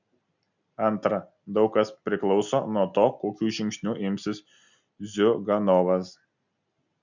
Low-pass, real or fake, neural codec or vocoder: 7.2 kHz; real; none